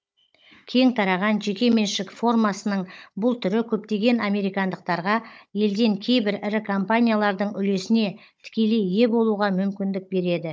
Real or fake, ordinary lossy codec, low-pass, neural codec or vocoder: fake; none; none; codec, 16 kHz, 16 kbps, FunCodec, trained on Chinese and English, 50 frames a second